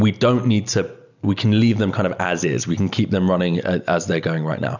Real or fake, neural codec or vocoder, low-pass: real; none; 7.2 kHz